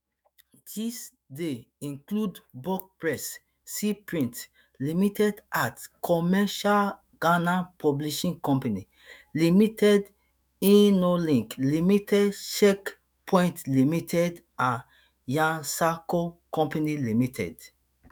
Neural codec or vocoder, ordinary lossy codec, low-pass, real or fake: autoencoder, 48 kHz, 128 numbers a frame, DAC-VAE, trained on Japanese speech; none; none; fake